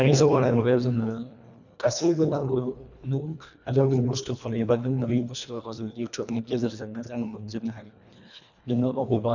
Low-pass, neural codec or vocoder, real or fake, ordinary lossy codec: 7.2 kHz; codec, 24 kHz, 1.5 kbps, HILCodec; fake; none